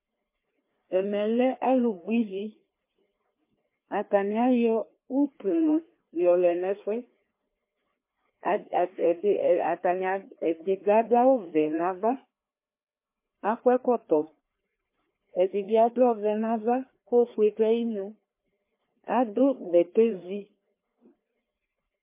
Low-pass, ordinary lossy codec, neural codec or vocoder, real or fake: 3.6 kHz; MP3, 24 kbps; codec, 16 kHz, 2 kbps, FreqCodec, larger model; fake